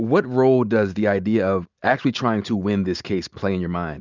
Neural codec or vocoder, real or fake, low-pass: none; real; 7.2 kHz